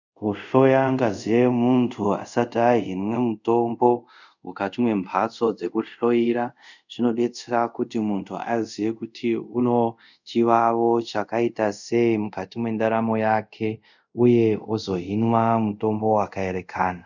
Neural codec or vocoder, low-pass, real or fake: codec, 24 kHz, 0.5 kbps, DualCodec; 7.2 kHz; fake